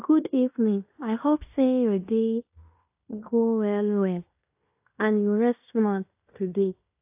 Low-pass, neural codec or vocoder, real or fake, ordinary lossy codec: 3.6 kHz; codec, 16 kHz in and 24 kHz out, 0.9 kbps, LongCat-Audio-Codec, fine tuned four codebook decoder; fake; AAC, 32 kbps